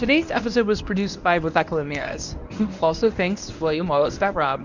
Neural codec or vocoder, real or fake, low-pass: codec, 24 kHz, 0.9 kbps, WavTokenizer, medium speech release version 1; fake; 7.2 kHz